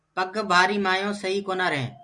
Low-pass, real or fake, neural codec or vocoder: 10.8 kHz; real; none